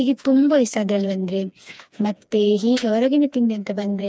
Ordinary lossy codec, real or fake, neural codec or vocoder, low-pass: none; fake; codec, 16 kHz, 2 kbps, FreqCodec, smaller model; none